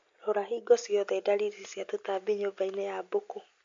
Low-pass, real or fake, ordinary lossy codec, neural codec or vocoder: 7.2 kHz; real; MP3, 48 kbps; none